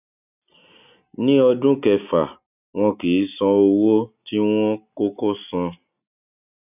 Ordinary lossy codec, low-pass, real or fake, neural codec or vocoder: none; 3.6 kHz; real; none